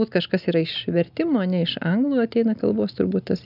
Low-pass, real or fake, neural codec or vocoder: 5.4 kHz; real; none